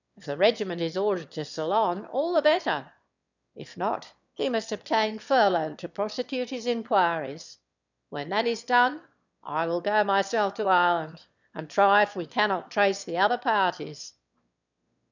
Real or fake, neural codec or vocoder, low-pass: fake; autoencoder, 22.05 kHz, a latent of 192 numbers a frame, VITS, trained on one speaker; 7.2 kHz